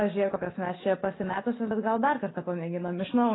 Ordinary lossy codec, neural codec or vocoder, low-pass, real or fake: AAC, 16 kbps; none; 7.2 kHz; real